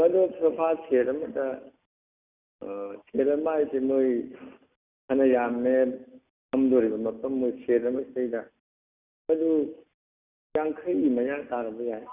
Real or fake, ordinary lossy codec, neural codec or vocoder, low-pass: real; Opus, 64 kbps; none; 3.6 kHz